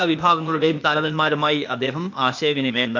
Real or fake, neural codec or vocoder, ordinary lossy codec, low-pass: fake; codec, 16 kHz, 0.8 kbps, ZipCodec; none; 7.2 kHz